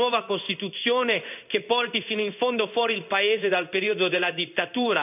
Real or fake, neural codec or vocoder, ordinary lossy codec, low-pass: fake; codec, 16 kHz in and 24 kHz out, 1 kbps, XY-Tokenizer; none; 3.6 kHz